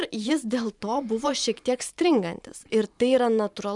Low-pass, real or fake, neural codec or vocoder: 10.8 kHz; fake; vocoder, 44.1 kHz, 128 mel bands every 512 samples, BigVGAN v2